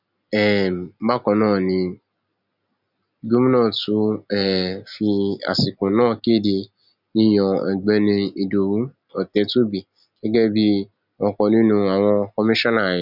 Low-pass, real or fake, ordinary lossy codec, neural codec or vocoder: 5.4 kHz; real; none; none